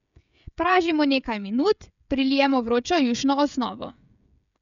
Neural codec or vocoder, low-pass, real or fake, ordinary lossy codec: codec, 16 kHz, 16 kbps, FreqCodec, smaller model; 7.2 kHz; fake; none